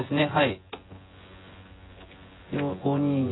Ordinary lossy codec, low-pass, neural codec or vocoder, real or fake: AAC, 16 kbps; 7.2 kHz; vocoder, 24 kHz, 100 mel bands, Vocos; fake